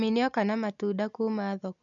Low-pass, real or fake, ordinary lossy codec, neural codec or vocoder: 7.2 kHz; real; none; none